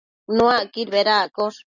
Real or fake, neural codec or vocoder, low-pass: real; none; 7.2 kHz